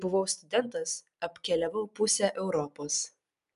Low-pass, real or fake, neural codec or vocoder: 10.8 kHz; real; none